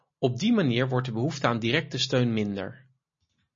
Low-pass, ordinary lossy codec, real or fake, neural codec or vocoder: 7.2 kHz; MP3, 32 kbps; real; none